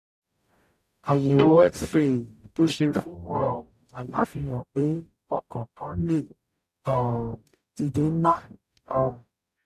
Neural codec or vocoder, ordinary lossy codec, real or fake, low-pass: codec, 44.1 kHz, 0.9 kbps, DAC; none; fake; 14.4 kHz